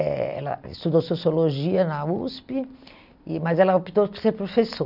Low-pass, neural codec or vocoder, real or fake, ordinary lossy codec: 5.4 kHz; none; real; none